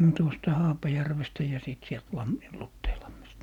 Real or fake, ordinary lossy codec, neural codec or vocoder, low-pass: real; none; none; 19.8 kHz